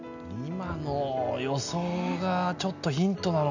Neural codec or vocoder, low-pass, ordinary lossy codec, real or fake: none; 7.2 kHz; none; real